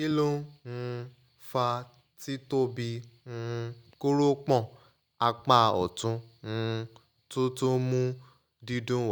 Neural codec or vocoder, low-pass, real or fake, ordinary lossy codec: none; none; real; none